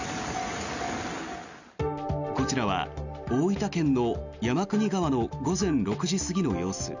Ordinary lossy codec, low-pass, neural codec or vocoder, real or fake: none; 7.2 kHz; none; real